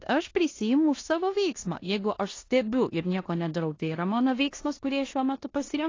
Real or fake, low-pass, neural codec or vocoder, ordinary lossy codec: fake; 7.2 kHz; codec, 16 kHz in and 24 kHz out, 0.9 kbps, LongCat-Audio-Codec, four codebook decoder; AAC, 32 kbps